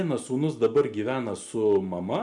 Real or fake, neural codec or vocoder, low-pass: real; none; 10.8 kHz